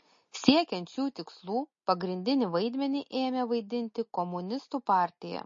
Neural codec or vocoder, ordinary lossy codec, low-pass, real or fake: none; MP3, 32 kbps; 7.2 kHz; real